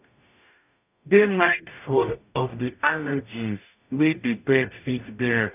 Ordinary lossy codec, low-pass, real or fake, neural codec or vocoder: none; 3.6 kHz; fake; codec, 44.1 kHz, 0.9 kbps, DAC